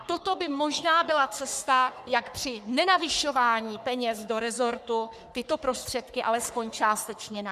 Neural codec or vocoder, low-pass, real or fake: codec, 44.1 kHz, 3.4 kbps, Pupu-Codec; 14.4 kHz; fake